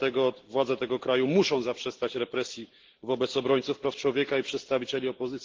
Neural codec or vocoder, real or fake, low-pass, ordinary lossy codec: none; real; 7.2 kHz; Opus, 16 kbps